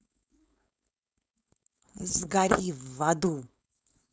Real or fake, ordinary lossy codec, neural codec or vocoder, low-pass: fake; none; codec, 16 kHz, 4.8 kbps, FACodec; none